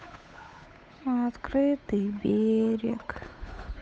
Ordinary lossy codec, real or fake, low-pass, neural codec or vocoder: none; fake; none; codec, 16 kHz, 8 kbps, FunCodec, trained on Chinese and English, 25 frames a second